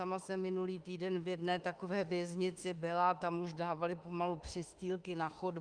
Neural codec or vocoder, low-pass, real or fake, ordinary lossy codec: autoencoder, 48 kHz, 32 numbers a frame, DAC-VAE, trained on Japanese speech; 9.9 kHz; fake; Opus, 32 kbps